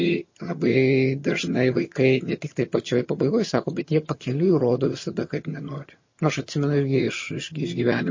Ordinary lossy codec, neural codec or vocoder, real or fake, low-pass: MP3, 32 kbps; vocoder, 22.05 kHz, 80 mel bands, HiFi-GAN; fake; 7.2 kHz